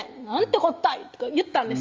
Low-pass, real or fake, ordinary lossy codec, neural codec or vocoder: 7.2 kHz; real; Opus, 32 kbps; none